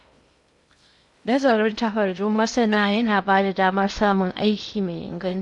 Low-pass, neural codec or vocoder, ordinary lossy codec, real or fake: 10.8 kHz; codec, 16 kHz in and 24 kHz out, 0.6 kbps, FocalCodec, streaming, 2048 codes; none; fake